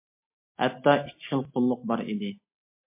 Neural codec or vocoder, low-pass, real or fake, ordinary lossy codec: none; 3.6 kHz; real; MP3, 24 kbps